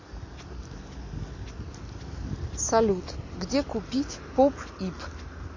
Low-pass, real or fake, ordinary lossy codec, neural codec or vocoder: 7.2 kHz; real; MP3, 32 kbps; none